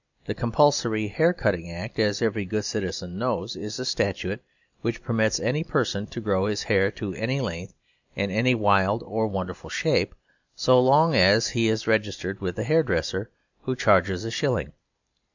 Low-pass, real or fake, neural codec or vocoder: 7.2 kHz; real; none